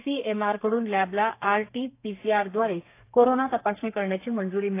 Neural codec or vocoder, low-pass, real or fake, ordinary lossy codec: codec, 32 kHz, 1.9 kbps, SNAC; 3.6 kHz; fake; AAC, 24 kbps